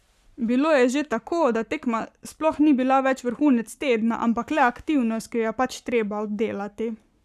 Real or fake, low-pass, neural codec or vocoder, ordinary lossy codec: fake; 14.4 kHz; codec, 44.1 kHz, 7.8 kbps, Pupu-Codec; none